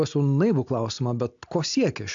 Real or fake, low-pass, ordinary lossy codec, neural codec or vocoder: real; 7.2 kHz; AAC, 64 kbps; none